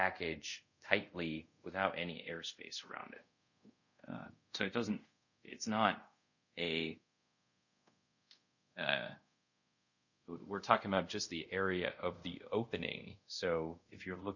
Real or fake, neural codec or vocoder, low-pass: fake; codec, 24 kHz, 0.5 kbps, DualCodec; 7.2 kHz